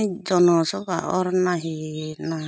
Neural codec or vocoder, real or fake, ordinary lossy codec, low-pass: none; real; none; none